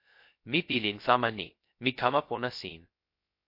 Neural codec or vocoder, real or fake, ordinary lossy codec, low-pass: codec, 16 kHz, 0.3 kbps, FocalCodec; fake; MP3, 32 kbps; 5.4 kHz